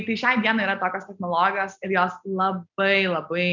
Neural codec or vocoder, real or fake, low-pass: none; real; 7.2 kHz